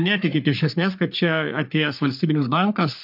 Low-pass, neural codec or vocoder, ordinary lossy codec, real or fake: 5.4 kHz; codec, 44.1 kHz, 3.4 kbps, Pupu-Codec; MP3, 48 kbps; fake